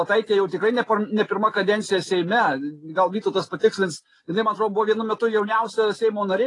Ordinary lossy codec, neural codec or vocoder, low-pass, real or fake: AAC, 32 kbps; none; 10.8 kHz; real